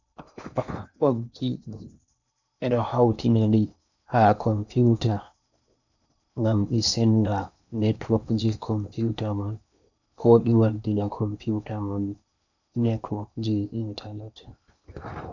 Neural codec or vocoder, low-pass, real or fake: codec, 16 kHz in and 24 kHz out, 0.8 kbps, FocalCodec, streaming, 65536 codes; 7.2 kHz; fake